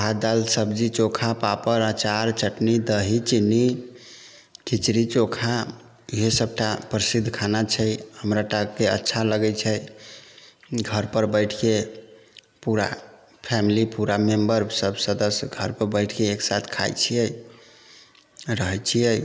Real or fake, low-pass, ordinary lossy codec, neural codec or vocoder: real; none; none; none